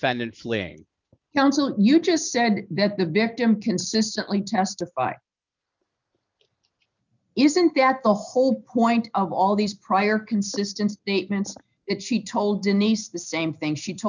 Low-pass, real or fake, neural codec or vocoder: 7.2 kHz; real; none